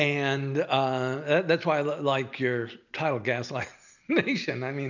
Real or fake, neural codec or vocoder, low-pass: real; none; 7.2 kHz